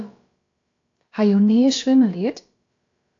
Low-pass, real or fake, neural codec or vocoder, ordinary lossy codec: 7.2 kHz; fake; codec, 16 kHz, about 1 kbps, DyCAST, with the encoder's durations; AAC, 48 kbps